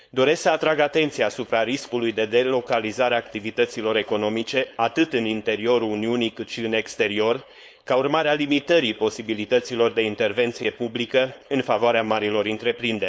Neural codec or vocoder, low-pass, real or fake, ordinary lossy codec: codec, 16 kHz, 4.8 kbps, FACodec; none; fake; none